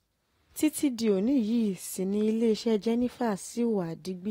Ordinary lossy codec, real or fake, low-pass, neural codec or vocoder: AAC, 48 kbps; real; 19.8 kHz; none